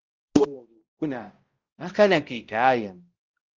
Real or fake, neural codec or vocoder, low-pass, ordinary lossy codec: fake; codec, 16 kHz, 0.5 kbps, X-Codec, HuBERT features, trained on balanced general audio; 7.2 kHz; Opus, 32 kbps